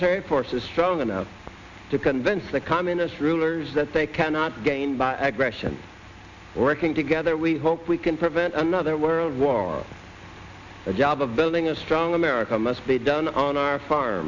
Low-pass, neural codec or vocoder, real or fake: 7.2 kHz; none; real